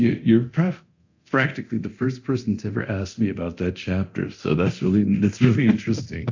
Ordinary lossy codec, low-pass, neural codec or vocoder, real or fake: AAC, 48 kbps; 7.2 kHz; codec, 24 kHz, 0.9 kbps, DualCodec; fake